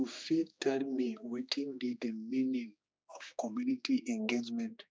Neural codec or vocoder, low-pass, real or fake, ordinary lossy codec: codec, 16 kHz, 2 kbps, X-Codec, HuBERT features, trained on general audio; none; fake; none